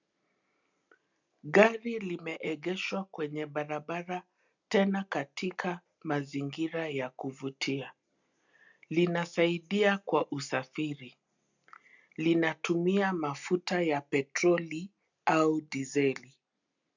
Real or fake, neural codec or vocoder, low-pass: real; none; 7.2 kHz